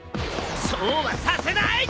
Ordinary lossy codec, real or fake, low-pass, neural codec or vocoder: none; real; none; none